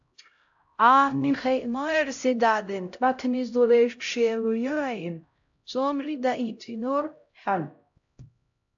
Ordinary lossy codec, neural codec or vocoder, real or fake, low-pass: AAC, 64 kbps; codec, 16 kHz, 0.5 kbps, X-Codec, HuBERT features, trained on LibriSpeech; fake; 7.2 kHz